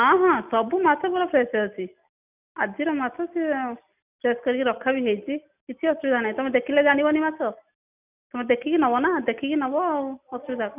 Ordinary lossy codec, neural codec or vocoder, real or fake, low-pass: none; none; real; 3.6 kHz